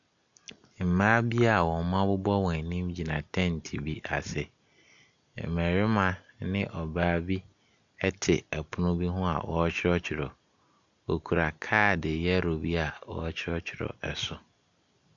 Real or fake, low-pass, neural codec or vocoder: real; 7.2 kHz; none